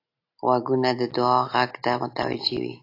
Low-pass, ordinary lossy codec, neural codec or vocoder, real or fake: 5.4 kHz; AAC, 32 kbps; none; real